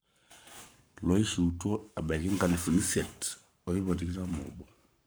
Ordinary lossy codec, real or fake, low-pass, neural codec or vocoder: none; fake; none; codec, 44.1 kHz, 7.8 kbps, Pupu-Codec